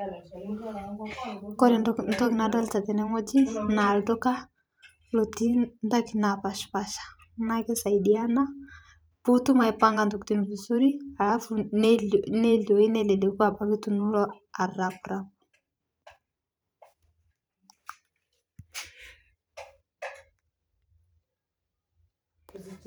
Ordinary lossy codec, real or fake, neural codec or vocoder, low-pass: none; fake; vocoder, 44.1 kHz, 128 mel bands every 512 samples, BigVGAN v2; none